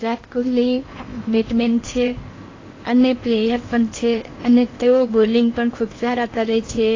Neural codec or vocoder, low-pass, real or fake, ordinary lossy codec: codec, 16 kHz in and 24 kHz out, 0.8 kbps, FocalCodec, streaming, 65536 codes; 7.2 kHz; fake; AAC, 32 kbps